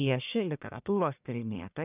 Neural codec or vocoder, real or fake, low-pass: codec, 44.1 kHz, 1.7 kbps, Pupu-Codec; fake; 3.6 kHz